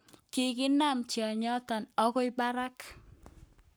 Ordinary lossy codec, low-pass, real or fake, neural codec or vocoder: none; none; fake; codec, 44.1 kHz, 7.8 kbps, Pupu-Codec